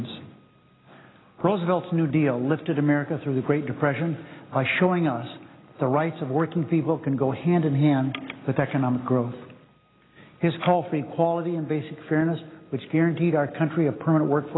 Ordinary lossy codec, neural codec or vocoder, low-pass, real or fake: AAC, 16 kbps; none; 7.2 kHz; real